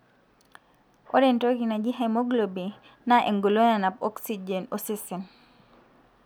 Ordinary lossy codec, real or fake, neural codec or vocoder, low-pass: none; real; none; none